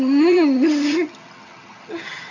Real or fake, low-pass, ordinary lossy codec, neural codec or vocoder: fake; 7.2 kHz; AAC, 48 kbps; vocoder, 22.05 kHz, 80 mel bands, HiFi-GAN